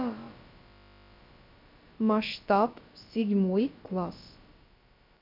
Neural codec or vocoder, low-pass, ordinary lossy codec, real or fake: codec, 16 kHz, about 1 kbps, DyCAST, with the encoder's durations; 5.4 kHz; MP3, 48 kbps; fake